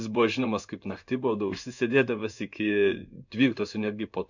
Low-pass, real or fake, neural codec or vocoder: 7.2 kHz; fake; codec, 16 kHz in and 24 kHz out, 1 kbps, XY-Tokenizer